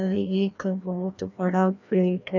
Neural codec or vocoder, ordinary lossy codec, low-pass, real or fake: codec, 16 kHz, 1 kbps, FreqCodec, larger model; none; 7.2 kHz; fake